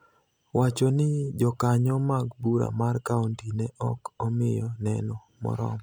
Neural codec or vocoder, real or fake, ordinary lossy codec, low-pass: none; real; none; none